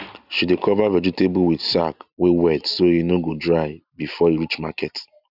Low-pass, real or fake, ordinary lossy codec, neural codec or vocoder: 5.4 kHz; real; none; none